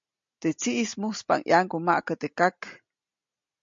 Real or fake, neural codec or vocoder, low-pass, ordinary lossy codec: real; none; 7.2 kHz; MP3, 48 kbps